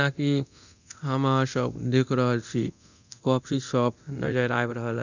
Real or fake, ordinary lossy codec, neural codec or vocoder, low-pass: fake; none; codec, 24 kHz, 0.9 kbps, DualCodec; 7.2 kHz